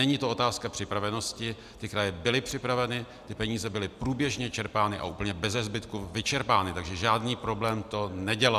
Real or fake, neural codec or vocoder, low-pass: real; none; 14.4 kHz